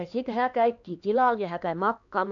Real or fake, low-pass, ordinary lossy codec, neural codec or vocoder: fake; 7.2 kHz; MP3, 64 kbps; codec, 16 kHz, 2 kbps, X-Codec, HuBERT features, trained on LibriSpeech